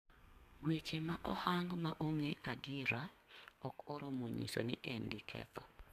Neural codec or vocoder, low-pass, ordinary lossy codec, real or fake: codec, 32 kHz, 1.9 kbps, SNAC; 14.4 kHz; none; fake